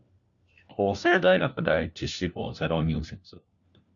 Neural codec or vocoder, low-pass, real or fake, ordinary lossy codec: codec, 16 kHz, 1 kbps, FunCodec, trained on LibriTTS, 50 frames a second; 7.2 kHz; fake; Opus, 64 kbps